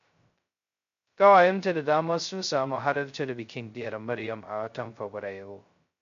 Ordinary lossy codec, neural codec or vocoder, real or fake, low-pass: MP3, 48 kbps; codec, 16 kHz, 0.2 kbps, FocalCodec; fake; 7.2 kHz